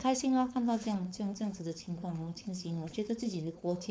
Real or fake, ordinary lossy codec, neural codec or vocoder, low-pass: fake; none; codec, 16 kHz, 4.8 kbps, FACodec; none